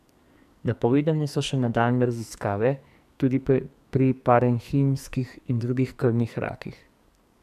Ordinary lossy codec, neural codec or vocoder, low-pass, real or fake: none; codec, 44.1 kHz, 2.6 kbps, SNAC; 14.4 kHz; fake